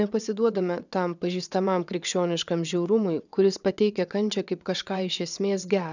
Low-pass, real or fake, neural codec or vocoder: 7.2 kHz; fake; vocoder, 22.05 kHz, 80 mel bands, WaveNeXt